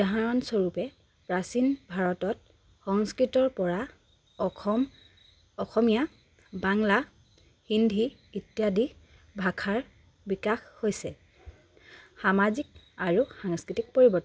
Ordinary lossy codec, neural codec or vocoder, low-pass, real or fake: none; none; none; real